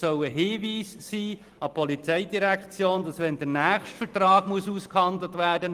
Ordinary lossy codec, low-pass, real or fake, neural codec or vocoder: Opus, 24 kbps; 14.4 kHz; fake; vocoder, 44.1 kHz, 128 mel bands every 256 samples, BigVGAN v2